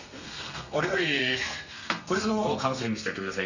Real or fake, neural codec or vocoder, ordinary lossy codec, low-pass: fake; codec, 32 kHz, 1.9 kbps, SNAC; none; 7.2 kHz